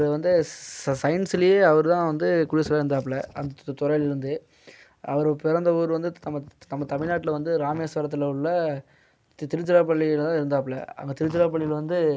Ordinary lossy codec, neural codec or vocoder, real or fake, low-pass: none; none; real; none